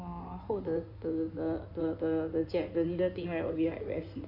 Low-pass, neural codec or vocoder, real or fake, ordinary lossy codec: 5.4 kHz; codec, 16 kHz in and 24 kHz out, 2.2 kbps, FireRedTTS-2 codec; fake; none